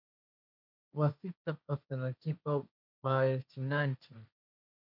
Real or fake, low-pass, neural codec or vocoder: fake; 5.4 kHz; codec, 16 kHz, 1.1 kbps, Voila-Tokenizer